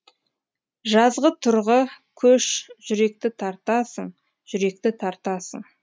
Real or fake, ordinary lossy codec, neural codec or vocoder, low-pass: real; none; none; none